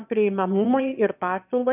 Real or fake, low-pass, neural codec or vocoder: fake; 3.6 kHz; autoencoder, 22.05 kHz, a latent of 192 numbers a frame, VITS, trained on one speaker